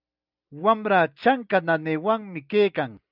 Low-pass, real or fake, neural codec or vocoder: 5.4 kHz; real; none